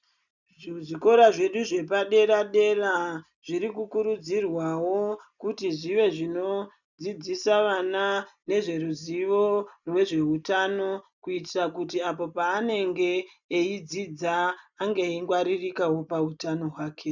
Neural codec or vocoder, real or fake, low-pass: none; real; 7.2 kHz